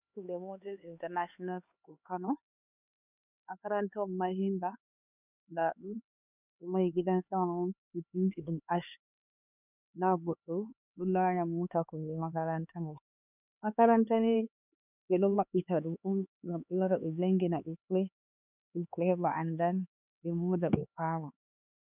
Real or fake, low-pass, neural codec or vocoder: fake; 3.6 kHz; codec, 16 kHz, 4 kbps, X-Codec, HuBERT features, trained on LibriSpeech